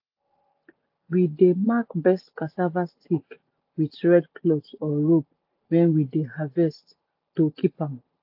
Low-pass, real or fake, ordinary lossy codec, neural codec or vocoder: 5.4 kHz; real; AAC, 48 kbps; none